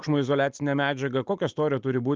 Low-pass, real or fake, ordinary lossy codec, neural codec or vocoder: 7.2 kHz; real; Opus, 32 kbps; none